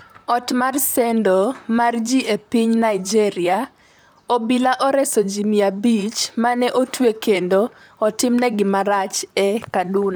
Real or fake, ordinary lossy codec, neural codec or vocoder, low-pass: fake; none; vocoder, 44.1 kHz, 128 mel bands, Pupu-Vocoder; none